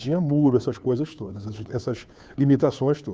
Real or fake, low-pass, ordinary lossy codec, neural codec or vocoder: fake; none; none; codec, 16 kHz, 2 kbps, FunCodec, trained on Chinese and English, 25 frames a second